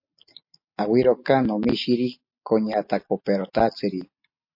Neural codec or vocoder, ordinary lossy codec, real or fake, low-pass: none; MP3, 32 kbps; real; 5.4 kHz